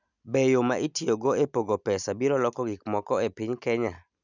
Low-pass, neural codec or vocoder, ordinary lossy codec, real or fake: 7.2 kHz; none; none; real